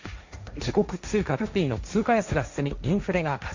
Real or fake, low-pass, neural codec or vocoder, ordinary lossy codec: fake; 7.2 kHz; codec, 16 kHz, 1.1 kbps, Voila-Tokenizer; Opus, 64 kbps